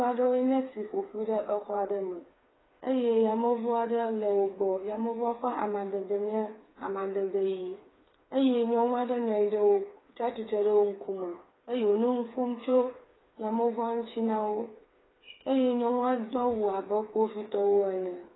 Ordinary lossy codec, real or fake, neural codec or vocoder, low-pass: AAC, 16 kbps; fake; codec, 16 kHz, 4 kbps, FreqCodec, smaller model; 7.2 kHz